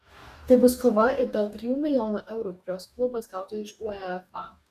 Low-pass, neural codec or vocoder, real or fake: 14.4 kHz; codec, 44.1 kHz, 2.6 kbps, DAC; fake